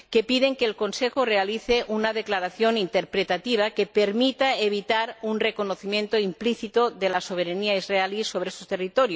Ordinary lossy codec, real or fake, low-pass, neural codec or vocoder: none; real; none; none